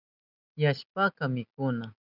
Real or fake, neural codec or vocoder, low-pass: real; none; 5.4 kHz